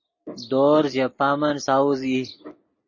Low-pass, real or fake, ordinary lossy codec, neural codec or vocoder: 7.2 kHz; real; MP3, 32 kbps; none